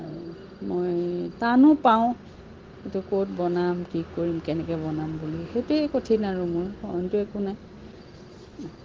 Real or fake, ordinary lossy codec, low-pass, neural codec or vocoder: real; Opus, 16 kbps; 7.2 kHz; none